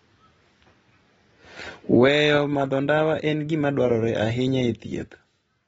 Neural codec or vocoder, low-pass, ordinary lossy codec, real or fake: none; 19.8 kHz; AAC, 24 kbps; real